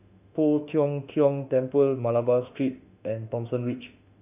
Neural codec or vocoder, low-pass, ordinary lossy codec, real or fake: autoencoder, 48 kHz, 32 numbers a frame, DAC-VAE, trained on Japanese speech; 3.6 kHz; none; fake